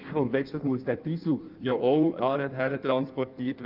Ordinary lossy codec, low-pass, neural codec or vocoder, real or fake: Opus, 24 kbps; 5.4 kHz; codec, 16 kHz in and 24 kHz out, 1.1 kbps, FireRedTTS-2 codec; fake